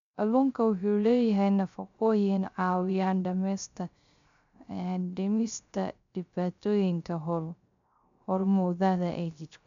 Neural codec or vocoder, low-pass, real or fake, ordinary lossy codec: codec, 16 kHz, 0.3 kbps, FocalCodec; 7.2 kHz; fake; MP3, 64 kbps